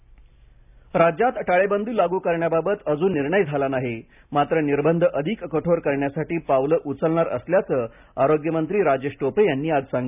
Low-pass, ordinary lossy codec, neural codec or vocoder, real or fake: 3.6 kHz; none; none; real